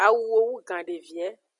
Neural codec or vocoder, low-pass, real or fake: none; 10.8 kHz; real